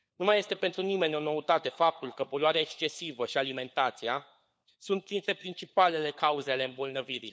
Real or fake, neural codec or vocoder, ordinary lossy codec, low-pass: fake; codec, 16 kHz, 4 kbps, FunCodec, trained on LibriTTS, 50 frames a second; none; none